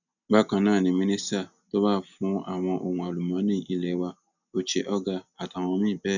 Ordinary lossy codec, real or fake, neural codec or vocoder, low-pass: none; real; none; 7.2 kHz